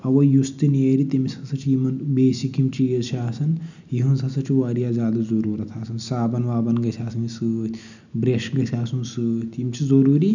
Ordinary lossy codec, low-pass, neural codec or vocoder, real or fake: none; 7.2 kHz; none; real